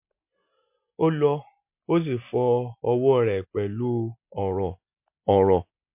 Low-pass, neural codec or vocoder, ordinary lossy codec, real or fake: 3.6 kHz; none; MP3, 32 kbps; real